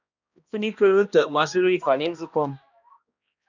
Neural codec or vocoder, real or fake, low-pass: codec, 16 kHz, 1 kbps, X-Codec, HuBERT features, trained on balanced general audio; fake; 7.2 kHz